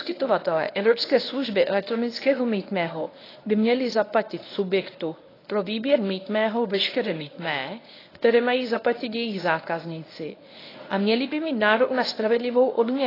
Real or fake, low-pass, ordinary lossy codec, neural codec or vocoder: fake; 5.4 kHz; AAC, 24 kbps; codec, 24 kHz, 0.9 kbps, WavTokenizer, medium speech release version 1